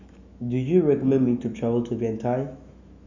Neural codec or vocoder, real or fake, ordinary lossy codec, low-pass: autoencoder, 48 kHz, 128 numbers a frame, DAC-VAE, trained on Japanese speech; fake; none; 7.2 kHz